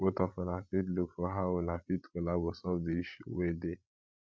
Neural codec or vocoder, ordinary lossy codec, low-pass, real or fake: codec, 16 kHz, 16 kbps, FreqCodec, larger model; none; none; fake